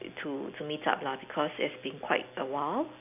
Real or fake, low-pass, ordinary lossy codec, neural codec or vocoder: real; 3.6 kHz; none; none